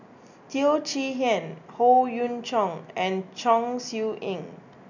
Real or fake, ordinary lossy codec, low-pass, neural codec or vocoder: real; none; 7.2 kHz; none